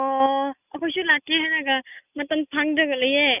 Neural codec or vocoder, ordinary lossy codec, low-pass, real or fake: none; none; 3.6 kHz; real